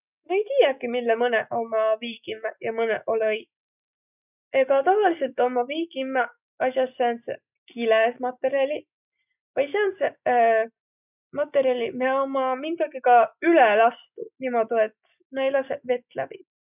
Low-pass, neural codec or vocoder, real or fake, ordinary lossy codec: 3.6 kHz; none; real; none